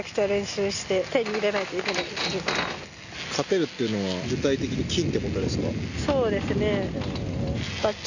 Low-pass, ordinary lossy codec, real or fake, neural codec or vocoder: 7.2 kHz; none; real; none